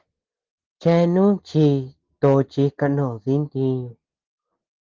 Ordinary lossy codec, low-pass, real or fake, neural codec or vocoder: Opus, 16 kbps; 7.2 kHz; real; none